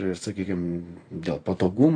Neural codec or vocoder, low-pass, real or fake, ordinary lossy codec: vocoder, 44.1 kHz, 128 mel bands every 512 samples, BigVGAN v2; 9.9 kHz; fake; AAC, 48 kbps